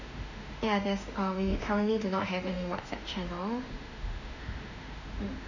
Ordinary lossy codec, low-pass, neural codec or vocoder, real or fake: none; 7.2 kHz; autoencoder, 48 kHz, 32 numbers a frame, DAC-VAE, trained on Japanese speech; fake